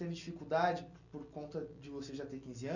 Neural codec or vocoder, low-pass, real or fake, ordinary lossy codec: none; 7.2 kHz; real; none